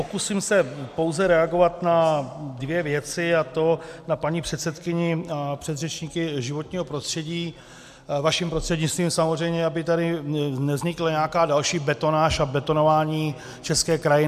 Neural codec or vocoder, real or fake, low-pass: none; real; 14.4 kHz